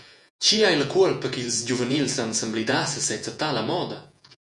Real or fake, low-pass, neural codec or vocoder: fake; 10.8 kHz; vocoder, 48 kHz, 128 mel bands, Vocos